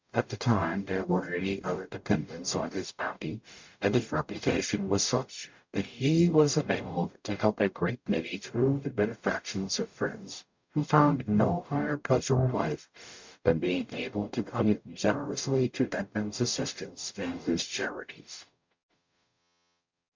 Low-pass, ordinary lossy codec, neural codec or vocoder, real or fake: 7.2 kHz; MP3, 64 kbps; codec, 44.1 kHz, 0.9 kbps, DAC; fake